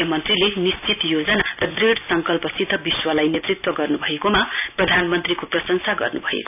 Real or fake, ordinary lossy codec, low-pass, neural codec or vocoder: real; none; 3.6 kHz; none